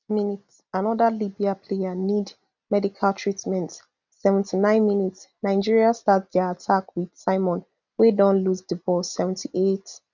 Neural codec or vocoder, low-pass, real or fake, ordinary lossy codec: none; 7.2 kHz; real; none